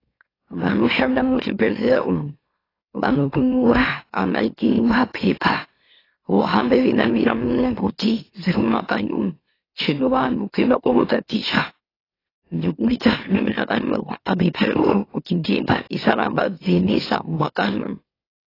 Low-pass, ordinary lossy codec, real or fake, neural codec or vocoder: 5.4 kHz; AAC, 24 kbps; fake; autoencoder, 44.1 kHz, a latent of 192 numbers a frame, MeloTTS